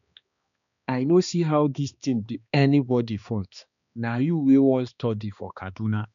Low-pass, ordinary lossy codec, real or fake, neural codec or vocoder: 7.2 kHz; none; fake; codec, 16 kHz, 2 kbps, X-Codec, HuBERT features, trained on balanced general audio